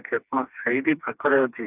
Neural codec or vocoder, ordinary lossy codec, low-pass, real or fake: codec, 16 kHz, 2 kbps, FreqCodec, smaller model; none; 3.6 kHz; fake